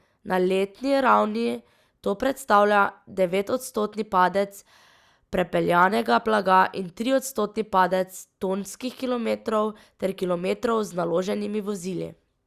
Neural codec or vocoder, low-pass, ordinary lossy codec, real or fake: none; 14.4 kHz; Opus, 64 kbps; real